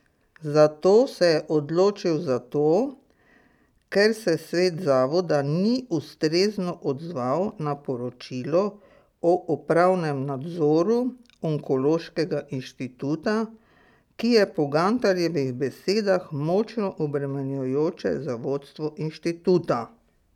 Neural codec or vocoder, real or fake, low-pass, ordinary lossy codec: none; real; 19.8 kHz; none